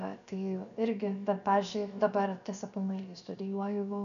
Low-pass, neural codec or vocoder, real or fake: 7.2 kHz; codec, 16 kHz, 0.7 kbps, FocalCodec; fake